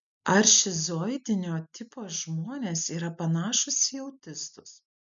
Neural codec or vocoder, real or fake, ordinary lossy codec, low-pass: none; real; MP3, 64 kbps; 7.2 kHz